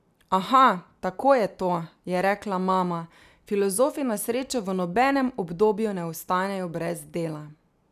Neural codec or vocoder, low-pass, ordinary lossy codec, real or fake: none; 14.4 kHz; none; real